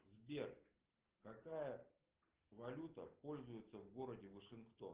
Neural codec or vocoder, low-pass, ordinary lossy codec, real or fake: none; 3.6 kHz; Opus, 16 kbps; real